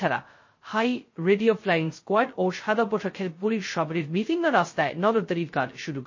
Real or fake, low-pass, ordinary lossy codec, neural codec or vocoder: fake; 7.2 kHz; MP3, 32 kbps; codec, 16 kHz, 0.2 kbps, FocalCodec